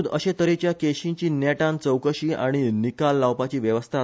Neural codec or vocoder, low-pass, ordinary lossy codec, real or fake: none; none; none; real